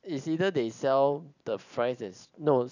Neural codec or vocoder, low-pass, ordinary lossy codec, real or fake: vocoder, 44.1 kHz, 128 mel bands every 512 samples, BigVGAN v2; 7.2 kHz; none; fake